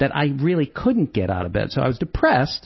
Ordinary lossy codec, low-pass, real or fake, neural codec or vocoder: MP3, 24 kbps; 7.2 kHz; real; none